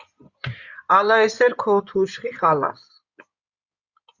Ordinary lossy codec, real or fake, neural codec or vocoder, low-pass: Opus, 64 kbps; fake; codec, 16 kHz in and 24 kHz out, 2.2 kbps, FireRedTTS-2 codec; 7.2 kHz